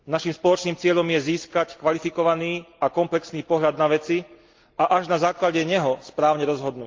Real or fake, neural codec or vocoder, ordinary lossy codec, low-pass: real; none; Opus, 32 kbps; 7.2 kHz